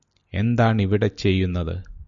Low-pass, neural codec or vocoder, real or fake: 7.2 kHz; none; real